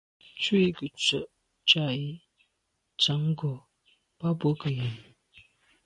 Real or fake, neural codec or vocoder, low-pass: real; none; 10.8 kHz